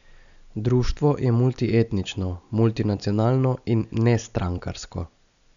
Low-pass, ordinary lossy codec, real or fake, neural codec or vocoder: 7.2 kHz; none; real; none